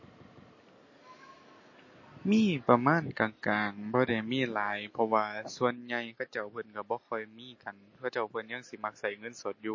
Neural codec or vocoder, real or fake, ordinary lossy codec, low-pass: none; real; MP3, 32 kbps; 7.2 kHz